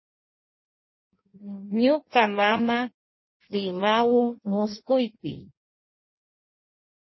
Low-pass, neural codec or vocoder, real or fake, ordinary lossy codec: 7.2 kHz; codec, 16 kHz in and 24 kHz out, 1.1 kbps, FireRedTTS-2 codec; fake; MP3, 24 kbps